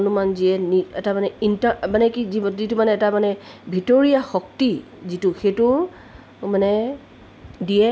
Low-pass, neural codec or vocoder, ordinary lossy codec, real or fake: none; none; none; real